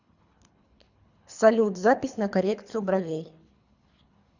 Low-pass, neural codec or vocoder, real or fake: 7.2 kHz; codec, 24 kHz, 3 kbps, HILCodec; fake